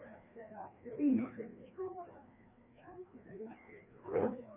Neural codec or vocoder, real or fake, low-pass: codec, 16 kHz, 2 kbps, FreqCodec, larger model; fake; 3.6 kHz